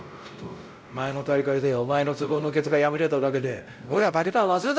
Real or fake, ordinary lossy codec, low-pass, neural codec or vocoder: fake; none; none; codec, 16 kHz, 0.5 kbps, X-Codec, WavLM features, trained on Multilingual LibriSpeech